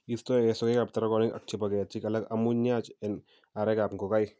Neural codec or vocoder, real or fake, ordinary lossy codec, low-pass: none; real; none; none